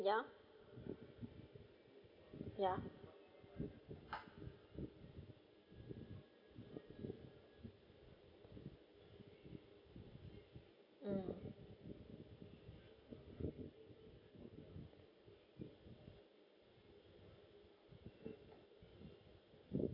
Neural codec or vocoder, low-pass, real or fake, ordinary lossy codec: none; 5.4 kHz; real; none